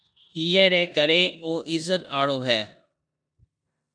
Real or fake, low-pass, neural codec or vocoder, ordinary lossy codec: fake; 9.9 kHz; codec, 16 kHz in and 24 kHz out, 0.9 kbps, LongCat-Audio-Codec, four codebook decoder; MP3, 96 kbps